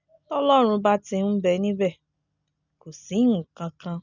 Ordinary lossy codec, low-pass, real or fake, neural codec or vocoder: none; 7.2 kHz; real; none